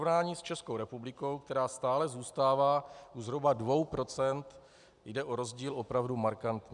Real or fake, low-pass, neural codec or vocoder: real; 10.8 kHz; none